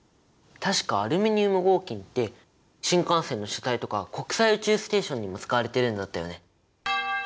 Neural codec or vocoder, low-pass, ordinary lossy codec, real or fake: none; none; none; real